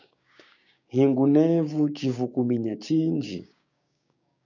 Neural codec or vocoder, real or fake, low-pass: codec, 16 kHz, 6 kbps, DAC; fake; 7.2 kHz